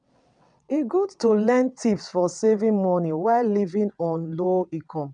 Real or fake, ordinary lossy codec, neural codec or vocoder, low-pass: fake; none; vocoder, 22.05 kHz, 80 mel bands, WaveNeXt; 9.9 kHz